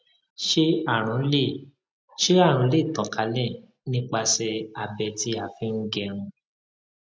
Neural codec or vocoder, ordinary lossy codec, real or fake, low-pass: none; none; real; none